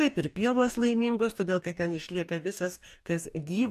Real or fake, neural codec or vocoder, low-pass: fake; codec, 44.1 kHz, 2.6 kbps, DAC; 14.4 kHz